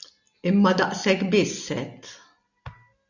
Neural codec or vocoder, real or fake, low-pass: none; real; 7.2 kHz